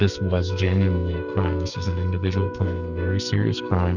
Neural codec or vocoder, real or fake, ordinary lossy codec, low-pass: codec, 44.1 kHz, 2.6 kbps, SNAC; fake; Opus, 64 kbps; 7.2 kHz